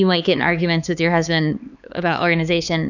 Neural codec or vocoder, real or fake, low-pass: codec, 16 kHz, 4 kbps, X-Codec, HuBERT features, trained on LibriSpeech; fake; 7.2 kHz